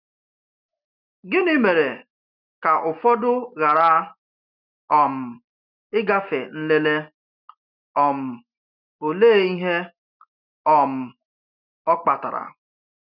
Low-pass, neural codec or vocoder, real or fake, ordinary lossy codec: 5.4 kHz; none; real; none